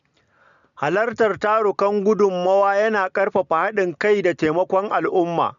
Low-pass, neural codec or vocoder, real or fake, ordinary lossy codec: 7.2 kHz; none; real; none